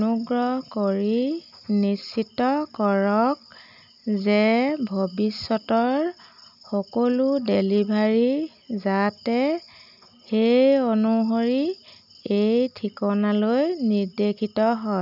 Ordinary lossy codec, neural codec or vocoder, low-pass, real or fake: none; none; 5.4 kHz; real